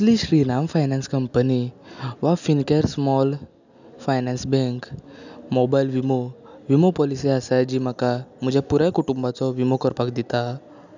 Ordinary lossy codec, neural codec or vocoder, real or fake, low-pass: none; none; real; 7.2 kHz